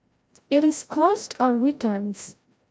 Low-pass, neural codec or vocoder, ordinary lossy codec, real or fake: none; codec, 16 kHz, 0.5 kbps, FreqCodec, larger model; none; fake